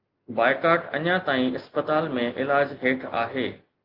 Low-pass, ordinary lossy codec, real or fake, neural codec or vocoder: 5.4 kHz; Opus, 32 kbps; real; none